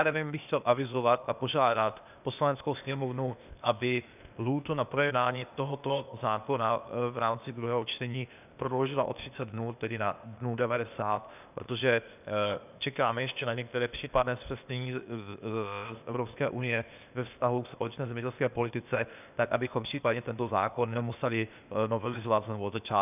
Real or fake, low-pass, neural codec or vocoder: fake; 3.6 kHz; codec, 16 kHz, 0.8 kbps, ZipCodec